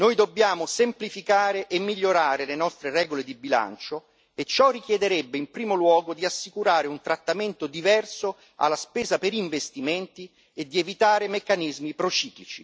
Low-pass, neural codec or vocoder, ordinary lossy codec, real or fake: none; none; none; real